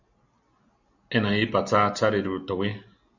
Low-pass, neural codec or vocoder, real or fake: 7.2 kHz; none; real